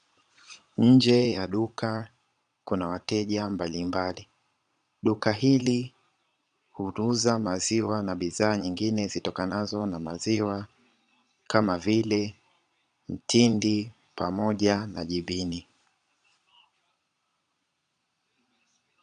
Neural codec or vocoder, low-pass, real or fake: vocoder, 22.05 kHz, 80 mel bands, Vocos; 9.9 kHz; fake